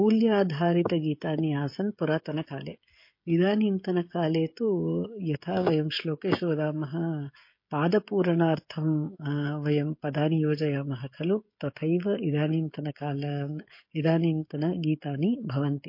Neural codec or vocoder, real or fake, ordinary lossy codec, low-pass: codec, 16 kHz, 16 kbps, FreqCodec, smaller model; fake; MP3, 32 kbps; 5.4 kHz